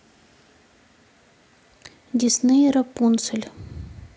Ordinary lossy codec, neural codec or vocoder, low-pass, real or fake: none; none; none; real